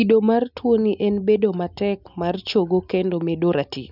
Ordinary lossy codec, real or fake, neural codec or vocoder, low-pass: none; real; none; 5.4 kHz